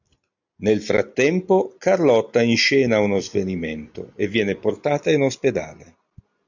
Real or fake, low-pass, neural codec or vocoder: real; 7.2 kHz; none